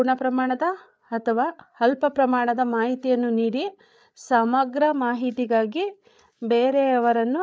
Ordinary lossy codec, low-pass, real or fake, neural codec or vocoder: none; none; fake; codec, 16 kHz, 8 kbps, FreqCodec, larger model